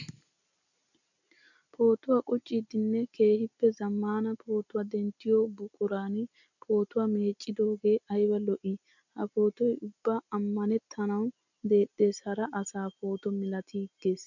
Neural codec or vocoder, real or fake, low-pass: none; real; 7.2 kHz